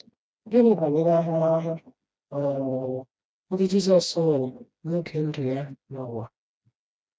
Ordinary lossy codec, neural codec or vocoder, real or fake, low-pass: none; codec, 16 kHz, 1 kbps, FreqCodec, smaller model; fake; none